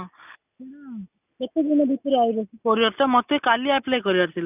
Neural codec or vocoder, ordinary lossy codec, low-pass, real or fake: none; none; 3.6 kHz; real